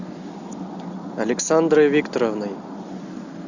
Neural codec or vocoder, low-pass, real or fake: none; 7.2 kHz; real